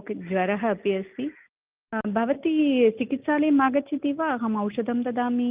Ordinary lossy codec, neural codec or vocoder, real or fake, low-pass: Opus, 24 kbps; none; real; 3.6 kHz